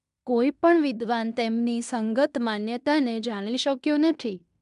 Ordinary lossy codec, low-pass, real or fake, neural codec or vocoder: MP3, 96 kbps; 10.8 kHz; fake; codec, 16 kHz in and 24 kHz out, 0.9 kbps, LongCat-Audio-Codec, fine tuned four codebook decoder